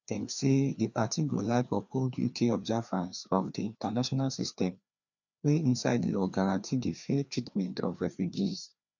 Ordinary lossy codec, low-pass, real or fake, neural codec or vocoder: none; 7.2 kHz; fake; codec, 16 kHz, 2 kbps, FreqCodec, larger model